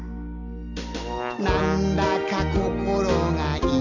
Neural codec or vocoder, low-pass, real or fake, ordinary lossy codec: none; 7.2 kHz; real; none